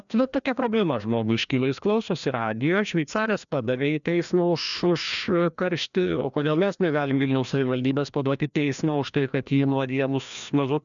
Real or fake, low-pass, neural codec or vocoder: fake; 7.2 kHz; codec, 16 kHz, 1 kbps, FreqCodec, larger model